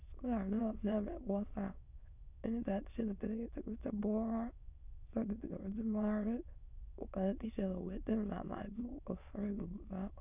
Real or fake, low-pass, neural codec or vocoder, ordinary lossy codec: fake; 3.6 kHz; autoencoder, 22.05 kHz, a latent of 192 numbers a frame, VITS, trained on many speakers; none